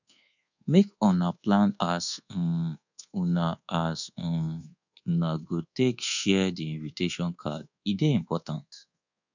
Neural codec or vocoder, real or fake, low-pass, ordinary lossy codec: codec, 24 kHz, 1.2 kbps, DualCodec; fake; 7.2 kHz; none